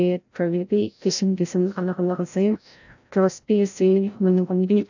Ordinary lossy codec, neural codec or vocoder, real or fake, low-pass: none; codec, 16 kHz, 0.5 kbps, FreqCodec, larger model; fake; 7.2 kHz